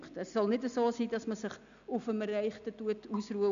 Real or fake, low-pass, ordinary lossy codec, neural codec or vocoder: real; 7.2 kHz; none; none